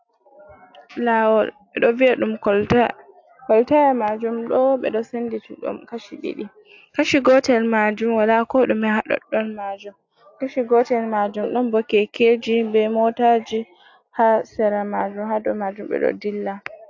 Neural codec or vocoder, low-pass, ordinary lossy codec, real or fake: none; 7.2 kHz; AAC, 48 kbps; real